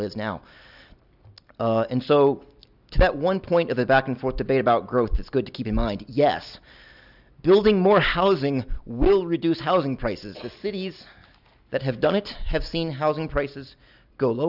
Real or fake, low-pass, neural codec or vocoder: real; 5.4 kHz; none